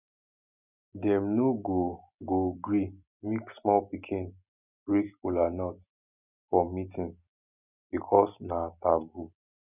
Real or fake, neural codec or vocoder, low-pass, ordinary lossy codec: real; none; 3.6 kHz; none